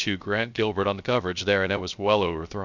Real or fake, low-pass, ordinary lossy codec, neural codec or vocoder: fake; 7.2 kHz; MP3, 64 kbps; codec, 16 kHz, 0.3 kbps, FocalCodec